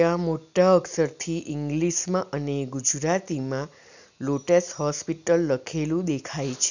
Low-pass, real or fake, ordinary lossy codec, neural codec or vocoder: 7.2 kHz; real; none; none